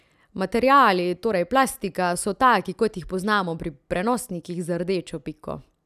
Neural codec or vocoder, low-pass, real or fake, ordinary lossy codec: none; 14.4 kHz; real; none